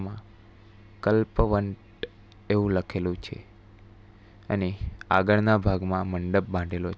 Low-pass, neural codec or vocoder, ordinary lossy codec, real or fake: none; none; none; real